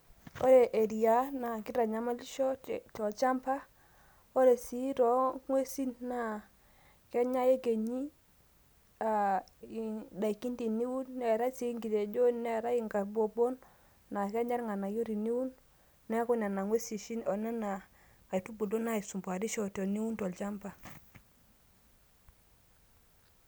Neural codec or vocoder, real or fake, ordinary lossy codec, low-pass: none; real; none; none